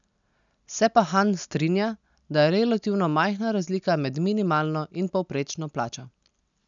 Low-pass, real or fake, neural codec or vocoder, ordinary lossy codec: 7.2 kHz; real; none; none